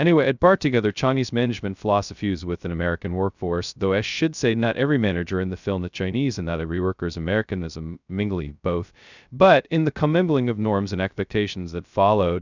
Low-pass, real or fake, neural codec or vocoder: 7.2 kHz; fake; codec, 16 kHz, 0.2 kbps, FocalCodec